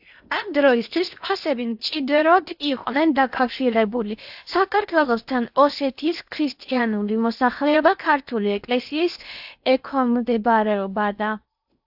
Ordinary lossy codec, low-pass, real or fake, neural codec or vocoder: AAC, 48 kbps; 5.4 kHz; fake; codec, 16 kHz in and 24 kHz out, 0.8 kbps, FocalCodec, streaming, 65536 codes